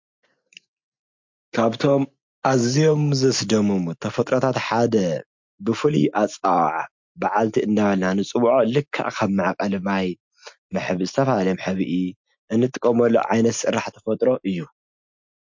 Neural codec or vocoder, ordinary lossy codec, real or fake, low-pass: none; MP3, 48 kbps; real; 7.2 kHz